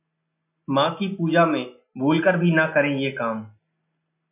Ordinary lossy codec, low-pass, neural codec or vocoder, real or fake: MP3, 32 kbps; 3.6 kHz; none; real